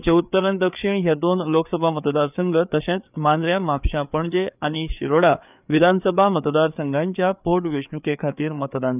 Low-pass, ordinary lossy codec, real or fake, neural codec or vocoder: 3.6 kHz; none; fake; codec, 16 kHz, 4 kbps, FreqCodec, larger model